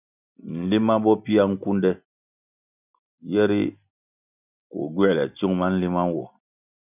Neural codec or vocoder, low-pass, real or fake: none; 3.6 kHz; real